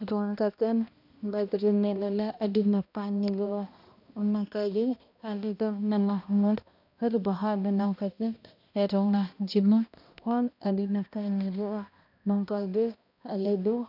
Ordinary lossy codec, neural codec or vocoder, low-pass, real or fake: none; codec, 16 kHz, 1 kbps, X-Codec, HuBERT features, trained on balanced general audio; 5.4 kHz; fake